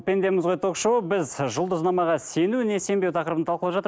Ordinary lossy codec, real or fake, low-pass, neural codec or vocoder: none; real; none; none